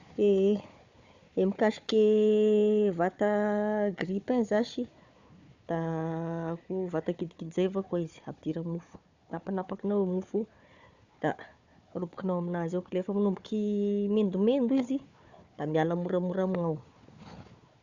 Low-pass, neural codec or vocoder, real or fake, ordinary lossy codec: 7.2 kHz; codec, 16 kHz, 4 kbps, FunCodec, trained on Chinese and English, 50 frames a second; fake; Opus, 64 kbps